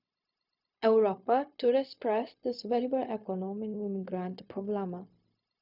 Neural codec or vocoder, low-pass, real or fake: codec, 16 kHz, 0.4 kbps, LongCat-Audio-Codec; 5.4 kHz; fake